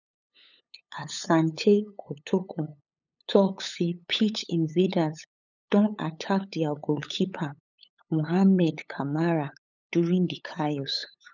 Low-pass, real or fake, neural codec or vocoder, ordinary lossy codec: 7.2 kHz; fake; codec, 16 kHz, 8 kbps, FunCodec, trained on LibriTTS, 25 frames a second; none